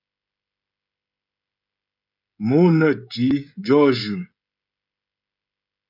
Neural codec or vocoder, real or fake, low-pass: codec, 16 kHz, 16 kbps, FreqCodec, smaller model; fake; 5.4 kHz